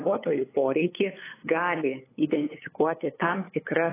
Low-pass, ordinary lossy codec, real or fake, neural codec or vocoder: 3.6 kHz; AAC, 16 kbps; fake; codec, 16 kHz, 8 kbps, FunCodec, trained on LibriTTS, 25 frames a second